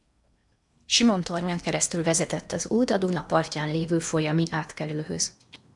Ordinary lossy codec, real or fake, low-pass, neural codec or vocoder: MP3, 96 kbps; fake; 10.8 kHz; codec, 16 kHz in and 24 kHz out, 0.8 kbps, FocalCodec, streaming, 65536 codes